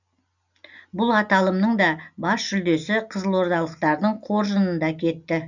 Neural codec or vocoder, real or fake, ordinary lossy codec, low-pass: none; real; none; 7.2 kHz